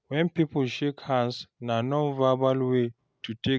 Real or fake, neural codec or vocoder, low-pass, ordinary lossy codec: real; none; none; none